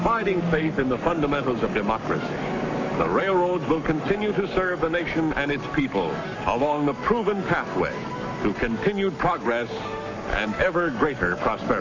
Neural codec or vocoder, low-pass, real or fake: codec, 44.1 kHz, 7.8 kbps, Pupu-Codec; 7.2 kHz; fake